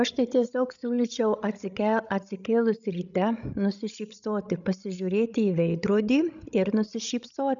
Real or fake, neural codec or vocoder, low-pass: fake; codec, 16 kHz, 16 kbps, FreqCodec, larger model; 7.2 kHz